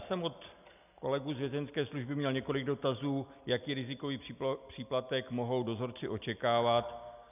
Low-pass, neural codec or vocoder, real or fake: 3.6 kHz; none; real